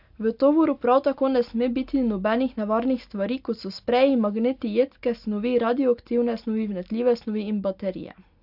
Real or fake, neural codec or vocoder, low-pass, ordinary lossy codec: real; none; 5.4 kHz; AAC, 48 kbps